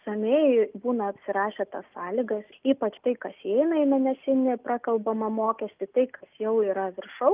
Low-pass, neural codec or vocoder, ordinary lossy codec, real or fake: 3.6 kHz; none; Opus, 32 kbps; real